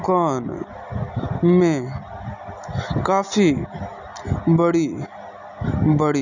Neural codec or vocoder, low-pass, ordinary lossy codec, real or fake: none; 7.2 kHz; none; real